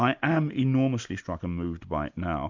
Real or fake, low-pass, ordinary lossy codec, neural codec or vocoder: real; 7.2 kHz; MP3, 64 kbps; none